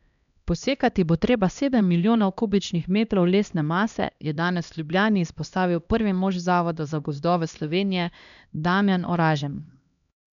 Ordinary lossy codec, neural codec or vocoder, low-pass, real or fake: none; codec, 16 kHz, 1 kbps, X-Codec, HuBERT features, trained on LibriSpeech; 7.2 kHz; fake